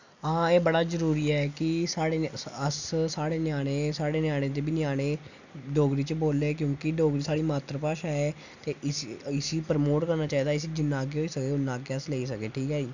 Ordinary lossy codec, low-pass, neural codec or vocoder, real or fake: none; 7.2 kHz; none; real